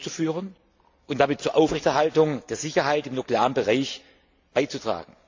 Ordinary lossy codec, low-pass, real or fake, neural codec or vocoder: AAC, 48 kbps; 7.2 kHz; real; none